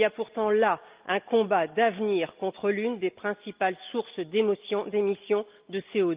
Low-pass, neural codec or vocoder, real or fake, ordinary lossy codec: 3.6 kHz; none; real; Opus, 32 kbps